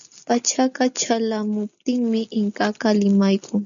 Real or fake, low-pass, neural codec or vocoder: real; 7.2 kHz; none